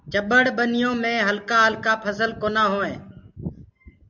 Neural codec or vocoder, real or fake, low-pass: none; real; 7.2 kHz